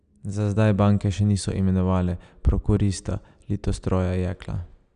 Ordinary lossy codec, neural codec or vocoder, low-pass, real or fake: none; none; 9.9 kHz; real